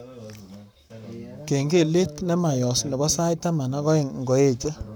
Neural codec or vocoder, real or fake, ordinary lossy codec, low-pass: codec, 44.1 kHz, 7.8 kbps, Pupu-Codec; fake; none; none